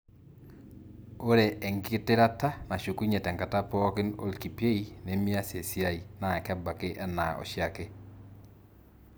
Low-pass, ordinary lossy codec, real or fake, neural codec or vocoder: none; none; real; none